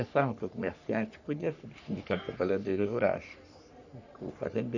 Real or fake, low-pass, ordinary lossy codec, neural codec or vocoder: fake; 7.2 kHz; none; codec, 44.1 kHz, 3.4 kbps, Pupu-Codec